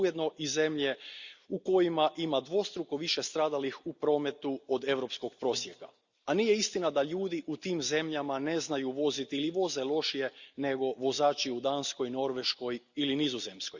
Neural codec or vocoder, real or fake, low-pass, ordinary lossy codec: none; real; 7.2 kHz; Opus, 64 kbps